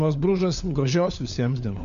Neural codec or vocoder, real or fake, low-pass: codec, 16 kHz, 4 kbps, FunCodec, trained on LibriTTS, 50 frames a second; fake; 7.2 kHz